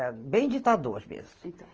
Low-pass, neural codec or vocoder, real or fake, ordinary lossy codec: 7.2 kHz; none; real; Opus, 24 kbps